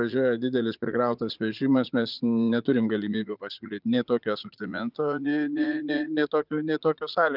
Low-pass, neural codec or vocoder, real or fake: 5.4 kHz; vocoder, 44.1 kHz, 80 mel bands, Vocos; fake